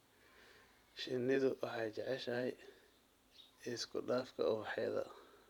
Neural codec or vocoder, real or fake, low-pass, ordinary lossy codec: vocoder, 44.1 kHz, 128 mel bands every 512 samples, BigVGAN v2; fake; 19.8 kHz; none